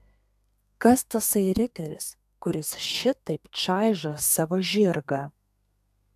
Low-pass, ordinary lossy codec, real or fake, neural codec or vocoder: 14.4 kHz; AAC, 96 kbps; fake; codec, 32 kHz, 1.9 kbps, SNAC